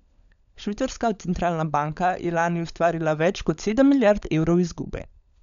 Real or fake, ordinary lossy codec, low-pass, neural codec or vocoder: fake; none; 7.2 kHz; codec, 16 kHz, 16 kbps, FunCodec, trained on LibriTTS, 50 frames a second